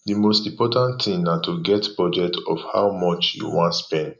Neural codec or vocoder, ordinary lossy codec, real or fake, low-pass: none; none; real; 7.2 kHz